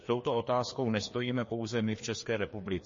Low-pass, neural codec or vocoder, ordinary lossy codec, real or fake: 7.2 kHz; codec, 16 kHz, 2 kbps, FreqCodec, larger model; MP3, 32 kbps; fake